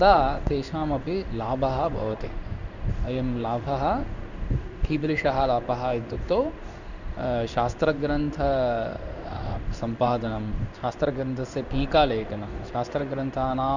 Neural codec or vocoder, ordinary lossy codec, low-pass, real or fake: codec, 16 kHz in and 24 kHz out, 1 kbps, XY-Tokenizer; none; 7.2 kHz; fake